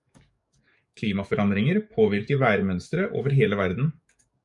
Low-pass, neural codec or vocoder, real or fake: 10.8 kHz; codec, 44.1 kHz, 7.8 kbps, DAC; fake